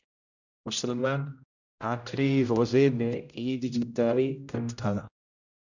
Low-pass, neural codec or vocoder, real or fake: 7.2 kHz; codec, 16 kHz, 0.5 kbps, X-Codec, HuBERT features, trained on general audio; fake